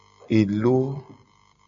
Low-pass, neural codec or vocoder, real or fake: 7.2 kHz; none; real